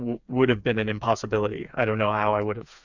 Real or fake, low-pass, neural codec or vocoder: fake; 7.2 kHz; codec, 16 kHz, 4 kbps, FreqCodec, smaller model